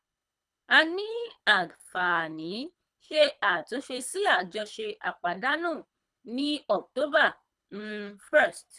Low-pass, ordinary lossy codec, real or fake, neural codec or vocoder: none; none; fake; codec, 24 kHz, 3 kbps, HILCodec